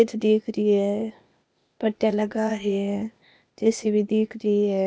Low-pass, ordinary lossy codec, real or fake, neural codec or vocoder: none; none; fake; codec, 16 kHz, 0.8 kbps, ZipCodec